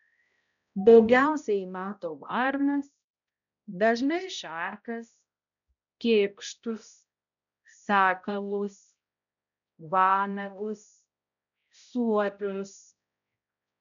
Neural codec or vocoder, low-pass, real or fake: codec, 16 kHz, 0.5 kbps, X-Codec, HuBERT features, trained on balanced general audio; 7.2 kHz; fake